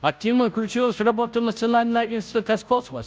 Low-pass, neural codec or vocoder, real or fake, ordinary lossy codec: none; codec, 16 kHz, 0.5 kbps, FunCodec, trained on Chinese and English, 25 frames a second; fake; none